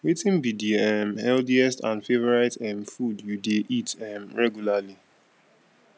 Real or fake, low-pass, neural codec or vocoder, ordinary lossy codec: real; none; none; none